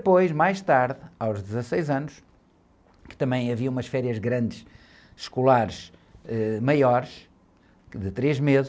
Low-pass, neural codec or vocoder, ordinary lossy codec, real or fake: none; none; none; real